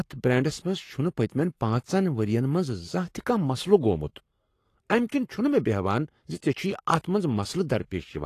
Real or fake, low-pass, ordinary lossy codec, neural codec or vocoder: fake; 14.4 kHz; AAC, 48 kbps; codec, 44.1 kHz, 7.8 kbps, Pupu-Codec